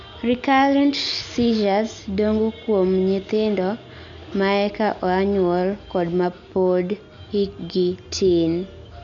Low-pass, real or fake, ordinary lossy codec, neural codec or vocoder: 7.2 kHz; real; none; none